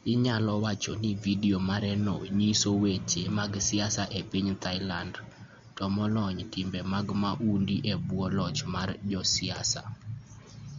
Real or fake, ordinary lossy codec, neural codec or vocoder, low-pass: real; MP3, 48 kbps; none; 7.2 kHz